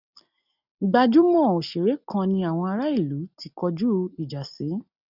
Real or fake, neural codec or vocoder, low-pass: real; none; 5.4 kHz